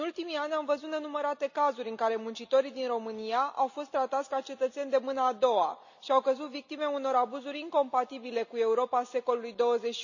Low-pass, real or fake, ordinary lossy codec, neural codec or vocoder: 7.2 kHz; real; none; none